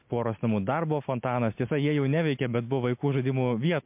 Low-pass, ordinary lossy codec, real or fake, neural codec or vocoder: 3.6 kHz; MP3, 32 kbps; real; none